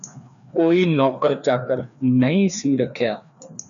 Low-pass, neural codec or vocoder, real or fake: 7.2 kHz; codec, 16 kHz, 2 kbps, FreqCodec, larger model; fake